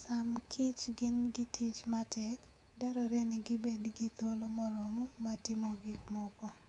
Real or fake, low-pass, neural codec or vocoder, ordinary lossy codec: fake; 10.8 kHz; codec, 24 kHz, 3.1 kbps, DualCodec; MP3, 96 kbps